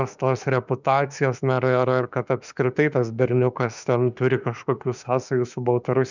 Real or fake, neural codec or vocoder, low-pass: fake; autoencoder, 48 kHz, 32 numbers a frame, DAC-VAE, trained on Japanese speech; 7.2 kHz